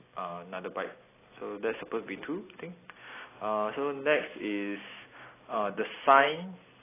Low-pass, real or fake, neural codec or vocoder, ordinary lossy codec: 3.6 kHz; real; none; AAC, 16 kbps